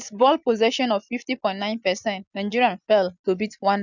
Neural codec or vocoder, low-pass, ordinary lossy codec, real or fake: none; 7.2 kHz; none; real